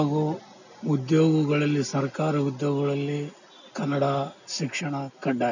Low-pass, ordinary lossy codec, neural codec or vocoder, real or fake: 7.2 kHz; none; none; real